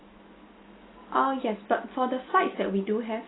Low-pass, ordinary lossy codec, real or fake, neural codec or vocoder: 7.2 kHz; AAC, 16 kbps; real; none